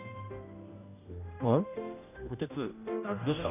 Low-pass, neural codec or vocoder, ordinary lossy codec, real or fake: 3.6 kHz; codec, 16 kHz, 1 kbps, X-Codec, HuBERT features, trained on general audio; none; fake